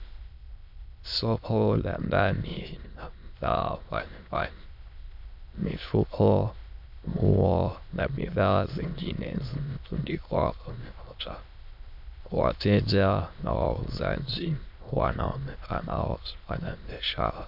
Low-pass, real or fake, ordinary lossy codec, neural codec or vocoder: 5.4 kHz; fake; MP3, 48 kbps; autoencoder, 22.05 kHz, a latent of 192 numbers a frame, VITS, trained on many speakers